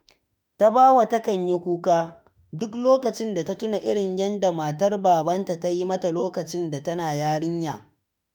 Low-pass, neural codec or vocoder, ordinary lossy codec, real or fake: none; autoencoder, 48 kHz, 32 numbers a frame, DAC-VAE, trained on Japanese speech; none; fake